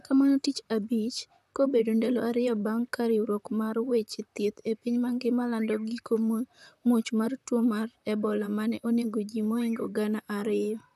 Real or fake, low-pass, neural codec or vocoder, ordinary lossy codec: fake; 14.4 kHz; vocoder, 44.1 kHz, 128 mel bands, Pupu-Vocoder; none